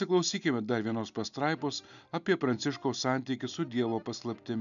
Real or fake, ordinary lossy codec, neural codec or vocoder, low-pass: real; MP3, 96 kbps; none; 7.2 kHz